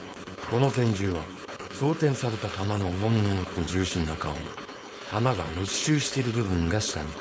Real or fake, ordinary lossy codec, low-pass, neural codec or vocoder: fake; none; none; codec, 16 kHz, 4.8 kbps, FACodec